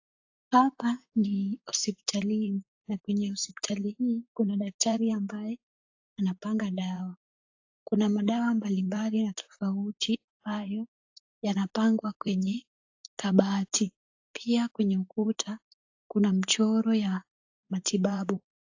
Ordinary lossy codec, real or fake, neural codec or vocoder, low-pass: AAC, 48 kbps; fake; vocoder, 44.1 kHz, 128 mel bands, Pupu-Vocoder; 7.2 kHz